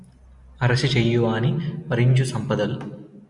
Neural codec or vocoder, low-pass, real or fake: none; 10.8 kHz; real